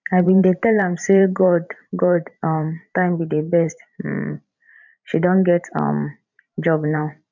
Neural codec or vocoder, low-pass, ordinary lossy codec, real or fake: vocoder, 44.1 kHz, 128 mel bands every 512 samples, BigVGAN v2; 7.2 kHz; none; fake